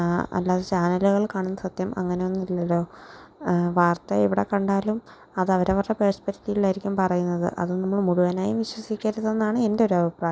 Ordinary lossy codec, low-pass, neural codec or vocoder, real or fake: none; none; none; real